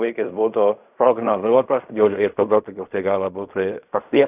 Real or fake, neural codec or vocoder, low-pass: fake; codec, 16 kHz in and 24 kHz out, 0.4 kbps, LongCat-Audio-Codec, fine tuned four codebook decoder; 3.6 kHz